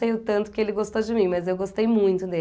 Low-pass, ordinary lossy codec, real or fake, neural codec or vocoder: none; none; real; none